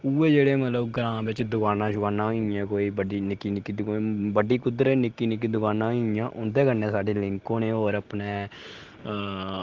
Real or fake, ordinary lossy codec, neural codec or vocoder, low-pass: real; Opus, 16 kbps; none; 7.2 kHz